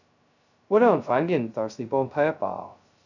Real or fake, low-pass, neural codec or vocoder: fake; 7.2 kHz; codec, 16 kHz, 0.2 kbps, FocalCodec